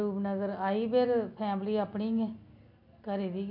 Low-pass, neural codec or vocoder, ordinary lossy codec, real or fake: 5.4 kHz; none; MP3, 48 kbps; real